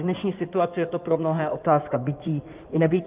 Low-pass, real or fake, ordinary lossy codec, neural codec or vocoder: 3.6 kHz; fake; Opus, 24 kbps; codec, 16 kHz in and 24 kHz out, 2.2 kbps, FireRedTTS-2 codec